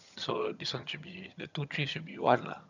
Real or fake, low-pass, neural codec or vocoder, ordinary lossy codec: fake; 7.2 kHz; vocoder, 22.05 kHz, 80 mel bands, HiFi-GAN; none